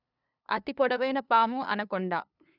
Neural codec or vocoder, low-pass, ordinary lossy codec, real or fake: codec, 16 kHz, 2 kbps, FunCodec, trained on LibriTTS, 25 frames a second; 5.4 kHz; none; fake